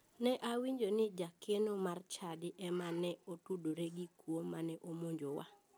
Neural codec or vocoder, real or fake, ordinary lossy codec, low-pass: none; real; none; none